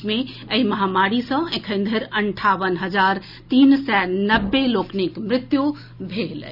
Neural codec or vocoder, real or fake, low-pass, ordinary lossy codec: none; real; 5.4 kHz; none